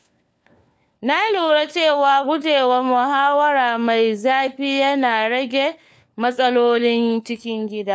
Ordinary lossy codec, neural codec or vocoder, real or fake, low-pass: none; codec, 16 kHz, 4 kbps, FunCodec, trained on LibriTTS, 50 frames a second; fake; none